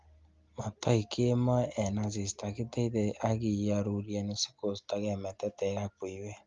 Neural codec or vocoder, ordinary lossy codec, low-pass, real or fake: none; Opus, 32 kbps; 7.2 kHz; real